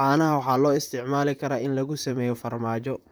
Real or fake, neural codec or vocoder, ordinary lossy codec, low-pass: fake; vocoder, 44.1 kHz, 128 mel bands, Pupu-Vocoder; none; none